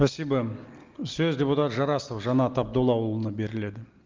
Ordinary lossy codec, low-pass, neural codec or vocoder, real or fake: Opus, 24 kbps; 7.2 kHz; none; real